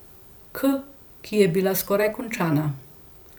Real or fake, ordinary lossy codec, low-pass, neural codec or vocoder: fake; none; none; vocoder, 44.1 kHz, 128 mel bands every 512 samples, BigVGAN v2